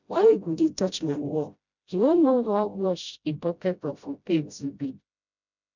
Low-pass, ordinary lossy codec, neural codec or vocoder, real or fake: 7.2 kHz; none; codec, 16 kHz, 0.5 kbps, FreqCodec, smaller model; fake